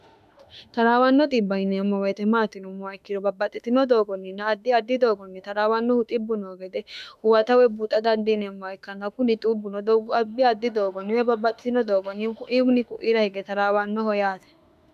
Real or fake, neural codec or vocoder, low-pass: fake; autoencoder, 48 kHz, 32 numbers a frame, DAC-VAE, trained on Japanese speech; 14.4 kHz